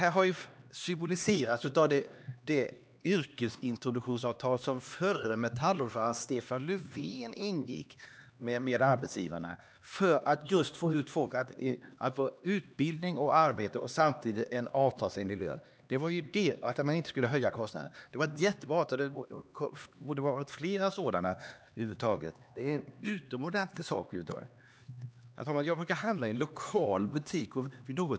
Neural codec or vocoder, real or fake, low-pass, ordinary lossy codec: codec, 16 kHz, 2 kbps, X-Codec, HuBERT features, trained on LibriSpeech; fake; none; none